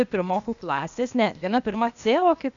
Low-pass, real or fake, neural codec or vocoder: 7.2 kHz; fake; codec, 16 kHz, 0.8 kbps, ZipCodec